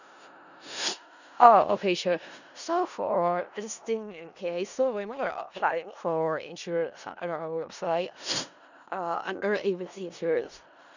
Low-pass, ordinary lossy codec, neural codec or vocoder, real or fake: 7.2 kHz; none; codec, 16 kHz in and 24 kHz out, 0.4 kbps, LongCat-Audio-Codec, four codebook decoder; fake